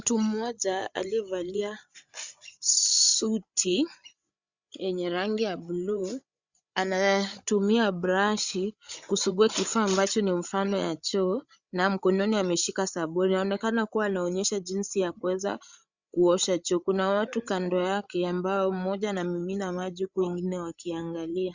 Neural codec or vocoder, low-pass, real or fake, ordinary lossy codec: codec, 16 kHz, 8 kbps, FreqCodec, larger model; 7.2 kHz; fake; Opus, 64 kbps